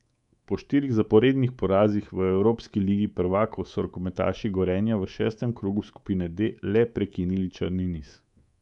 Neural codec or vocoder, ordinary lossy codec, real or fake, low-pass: codec, 24 kHz, 3.1 kbps, DualCodec; none; fake; 10.8 kHz